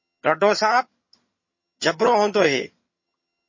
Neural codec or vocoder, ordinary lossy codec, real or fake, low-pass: vocoder, 22.05 kHz, 80 mel bands, HiFi-GAN; MP3, 32 kbps; fake; 7.2 kHz